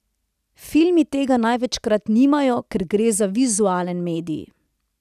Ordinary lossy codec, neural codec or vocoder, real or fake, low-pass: none; none; real; 14.4 kHz